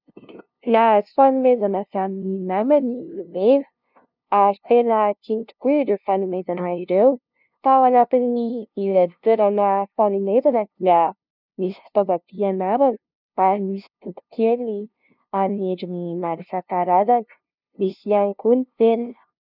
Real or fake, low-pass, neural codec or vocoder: fake; 5.4 kHz; codec, 16 kHz, 0.5 kbps, FunCodec, trained on LibriTTS, 25 frames a second